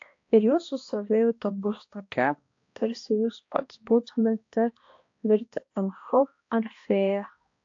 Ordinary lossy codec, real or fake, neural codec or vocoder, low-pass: AAC, 48 kbps; fake; codec, 16 kHz, 1 kbps, X-Codec, HuBERT features, trained on balanced general audio; 7.2 kHz